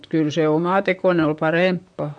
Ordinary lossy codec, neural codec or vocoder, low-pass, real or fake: MP3, 64 kbps; vocoder, 44.1 kHz, 128 mel bands, Pupu-Vocoder; 9.9 kHz; fake